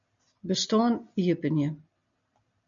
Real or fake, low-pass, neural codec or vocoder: real; 7.2 kHz; none